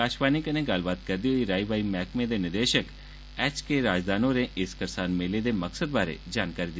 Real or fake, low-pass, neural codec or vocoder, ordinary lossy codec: real; none; none; none